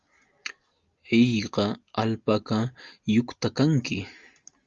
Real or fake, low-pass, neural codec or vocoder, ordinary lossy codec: real; 7.2 kHz; none; Opus, 32 kbps